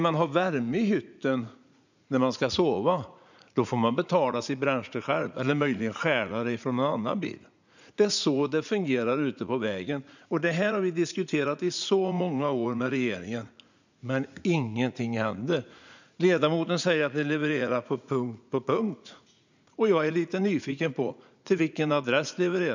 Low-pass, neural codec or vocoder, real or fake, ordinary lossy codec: 7.2 kHz; vocoder, 44.1 kHz, 80 mel bands, Vocos; fake; none